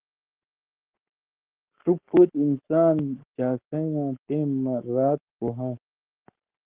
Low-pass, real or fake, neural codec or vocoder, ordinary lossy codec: 3.6 kHz; fake; codec, 44.1 kHz, 7.8 kbps, Pupu-Codec; Opus, 16 kbps